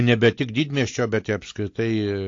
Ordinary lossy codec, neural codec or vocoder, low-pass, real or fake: AAC, 48 kbps; none; 7.2 kHz; real